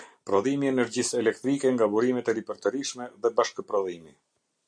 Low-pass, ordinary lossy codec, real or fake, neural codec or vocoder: 9.9 kHz; AAC, 64 kbps; real; none